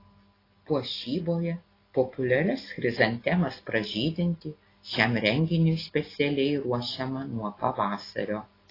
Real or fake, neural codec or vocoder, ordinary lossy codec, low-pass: real; none; AAC, 24 kbps; 5.4 kHz